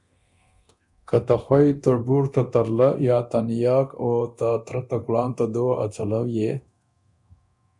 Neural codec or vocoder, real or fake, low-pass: codec, 24 kHz, 0.9 kbps, DualCodec; fake; 10.8 kHz